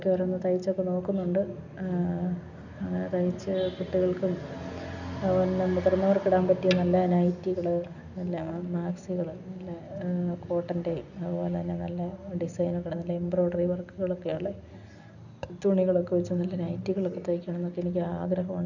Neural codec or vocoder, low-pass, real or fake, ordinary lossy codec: none; 7.2 kHz; real; none